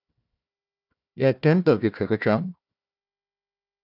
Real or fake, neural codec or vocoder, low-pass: fake; codec, 16 kHz, 1 kbps, FunCodec, trained on Chinese and English, 50 frames a second; 5.4 kHz